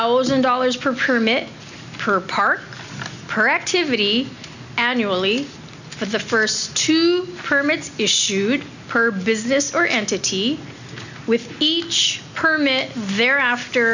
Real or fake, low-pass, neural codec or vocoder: real; 7.2 kHz; none